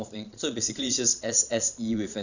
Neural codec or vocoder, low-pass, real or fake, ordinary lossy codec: vocoder, 22.05 kHz, 80 mel bands, Vocos; 7.2 kHz; fake; none